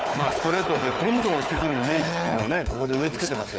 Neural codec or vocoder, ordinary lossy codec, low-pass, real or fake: codec, 16 kHz, 4 kbps, FunCodec, trained on Chinese and English, 50 frames a second; none; none; fake